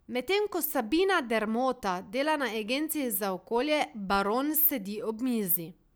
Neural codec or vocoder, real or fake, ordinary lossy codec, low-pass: none; real; none; none